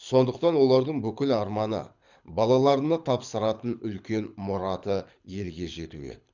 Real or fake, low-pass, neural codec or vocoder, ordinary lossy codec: fake; 7.2 kHz; codec, 24 kHz, 6 kbps, HILCodec; none